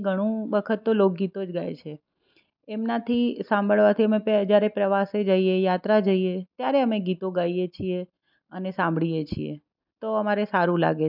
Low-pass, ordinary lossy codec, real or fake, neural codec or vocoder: 5.4 kHz; none; real; none